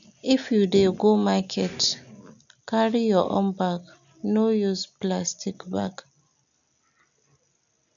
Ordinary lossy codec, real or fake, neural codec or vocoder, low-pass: none; real; none; 7.2 kHz